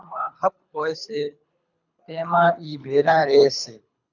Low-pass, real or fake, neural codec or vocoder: 7.2 kHz; fake; codec, 24 kHz, 3 kbps, HILCodec